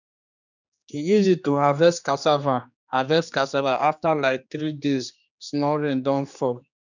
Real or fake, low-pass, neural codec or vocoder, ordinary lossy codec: fake; 7.2 kHz; codec, 16 kHz, 2 kbps, X-Codec, HuBERT features, trained on general audio; none